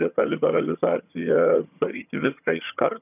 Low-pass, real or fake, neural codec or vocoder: 3.6 kHz; fake; vocoder, 22.05 kHz, 80 mel bands, HiFi-GAN